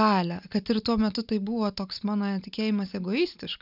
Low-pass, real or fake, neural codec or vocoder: 5.4 kHz; real; none